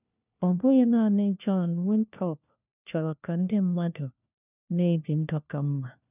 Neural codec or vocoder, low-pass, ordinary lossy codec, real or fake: codec, 16 kHz, 1 kbps, FunCodec, trained on LibriTTS, 50 frames a second; 3.6 kHz; none; fake